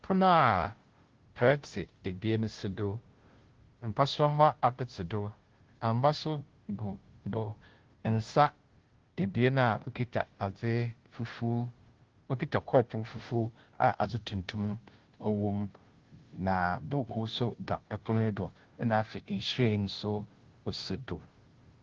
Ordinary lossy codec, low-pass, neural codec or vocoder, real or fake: Opus, 16 kbps; 7.2 kHz; codec, 16 kHz, 0.5 kbps, FunCodec, trained on Chinese and English, 25 frames a second; fake